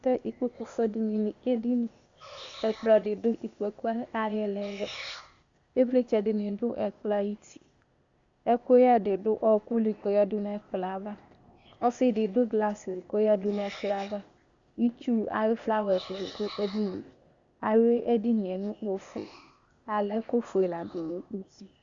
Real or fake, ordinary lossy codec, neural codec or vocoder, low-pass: fake; Opus, 64 kbps; codec, 16 kHz, 0.8 kbps, ZipCodec; 7.2 kHz